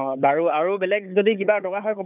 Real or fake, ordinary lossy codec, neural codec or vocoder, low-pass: fake; none; codec, 16 kHz, 4 kbps, FunCodec, trained on Chinese and English, 50 frames a second; 3.6 kHz